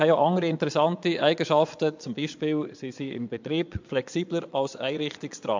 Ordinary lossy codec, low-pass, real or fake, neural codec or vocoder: MP3, 64 kbps; 7.2 kHz; fake; vocoder, 22.05 kHz, 80 mel bands, Vocos